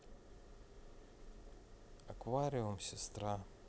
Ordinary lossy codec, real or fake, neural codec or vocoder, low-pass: none; real; none; none